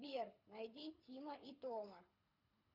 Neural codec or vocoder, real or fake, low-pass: codec, 24 kHz, 6 kbps, HILCodec; fake; 5.4 kHz